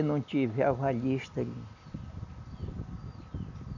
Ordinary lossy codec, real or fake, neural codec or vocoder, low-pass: none; real; none; 7.2 kHz